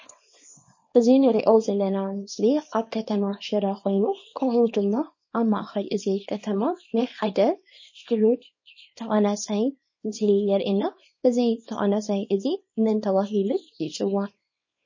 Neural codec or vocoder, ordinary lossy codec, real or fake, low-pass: codec, 24 kHz, 0.9 kbps, WavTokenizer, small release; MP3, 32 kbps; fake; 7.2 kHz